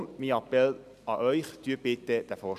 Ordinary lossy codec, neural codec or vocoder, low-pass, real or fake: none; none; 14.4 kHz; real